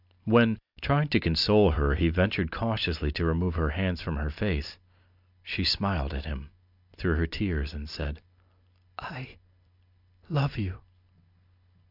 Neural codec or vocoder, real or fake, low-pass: none; real; 5.4 kHz